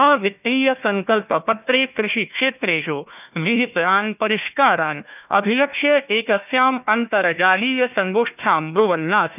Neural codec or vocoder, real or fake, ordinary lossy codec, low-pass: codec, 16 kHz, 1 kbps, FunCodec, trained on Chinese and English, 50 frames a second; fake; none; 3.6 kHz